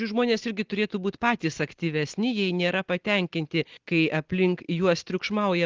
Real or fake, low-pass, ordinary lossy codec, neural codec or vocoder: real; 7.2 kHz; Opus, 16 kbps; none